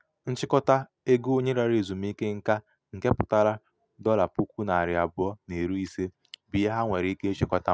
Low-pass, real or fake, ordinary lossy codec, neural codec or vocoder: none; real; none; none